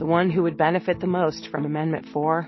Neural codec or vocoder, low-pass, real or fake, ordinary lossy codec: none; 7.2 kHz; real; MP3, 24 kbps